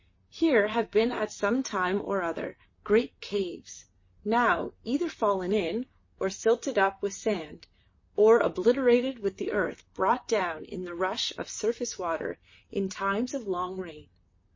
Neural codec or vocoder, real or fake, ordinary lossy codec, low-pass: vocoder, 44.1 kHz, 128 mel bands, Pupu-Vocoder; fake; MP3, 32 kbps; 7.2 kHz